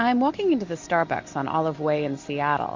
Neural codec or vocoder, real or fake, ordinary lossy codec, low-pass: none; real; MP3, 48 kbps; 7.2 kHz